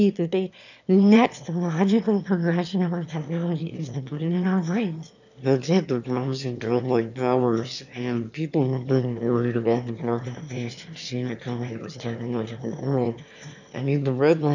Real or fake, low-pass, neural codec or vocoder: fake; 7.2 kHz; autoencoder, 22.05 kHz, a latent of 192 numbers a frame, VITS, trained on one speaker